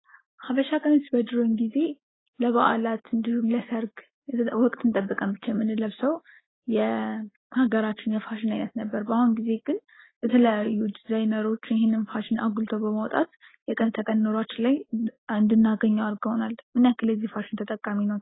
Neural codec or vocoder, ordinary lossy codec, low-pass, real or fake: none; AAC, 16 kbps; 7.2 kHz; real